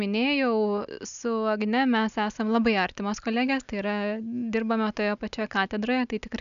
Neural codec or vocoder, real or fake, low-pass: none; real; 7.2 kHz